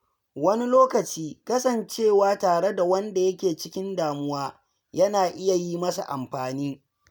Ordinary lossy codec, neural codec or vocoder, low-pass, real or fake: none; none; none; real